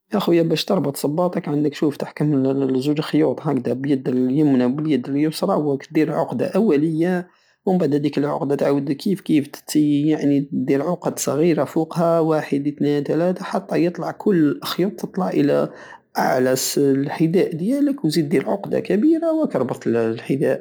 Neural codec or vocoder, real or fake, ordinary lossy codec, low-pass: none; real; none; none